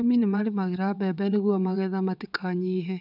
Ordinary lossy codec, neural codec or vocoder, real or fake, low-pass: none; vocoder, 22.05 kHz, 80 mel bands, WaveNeXt; fake; 5.4 kHz